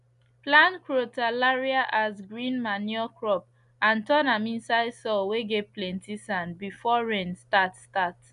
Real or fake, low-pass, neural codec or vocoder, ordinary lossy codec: real; 10.8 kHz; none; none